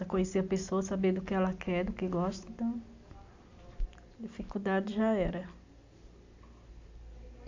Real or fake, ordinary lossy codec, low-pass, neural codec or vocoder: real; AAC, 48 kbps; 7.2 kHz; none